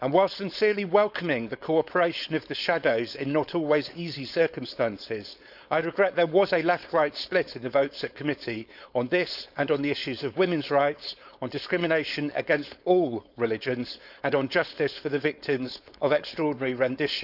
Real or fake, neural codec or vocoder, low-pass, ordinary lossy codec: fake; codec, 16 kHz, 4.8 kbps, FACodec; 5.4 kHz; none